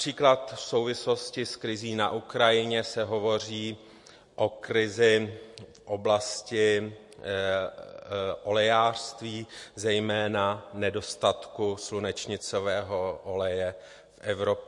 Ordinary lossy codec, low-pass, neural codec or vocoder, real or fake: MP3, 48 kbps; 10.8 kHz; none; real